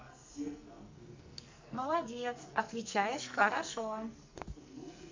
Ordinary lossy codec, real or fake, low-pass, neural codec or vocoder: MP3, 64 kbps; fake; 7.2 kHz; codec, 44.1 kHz, 2.6 kbps, SNAC